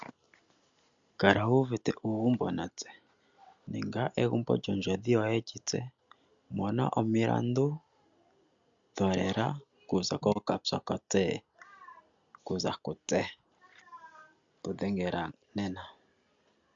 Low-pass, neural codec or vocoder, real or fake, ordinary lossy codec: 7.2 kHz; none; real; MP3, 64 kbps